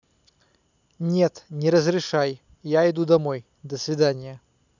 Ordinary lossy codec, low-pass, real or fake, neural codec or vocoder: none; 7.2 kHz; real; none